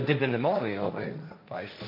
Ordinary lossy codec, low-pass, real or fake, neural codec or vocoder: MP3, 32 kbps; 5.4 kHz; fake; codec, 16 kHz, 1.1 kbps, Voila-Tokenizer